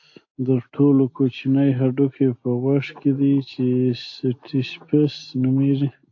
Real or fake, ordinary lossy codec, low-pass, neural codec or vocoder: real; AAC, 48 kbps; 7.2 kHz; none